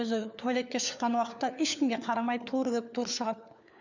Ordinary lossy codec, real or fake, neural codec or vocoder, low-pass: none; fake; codec, 16 kHz, 4 kbps, FunCodec, trained on LibriTTS, 50 frames a second; 7.2 kHz